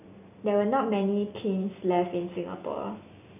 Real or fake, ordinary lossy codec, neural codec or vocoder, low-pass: real; none; none; 3.6 kHz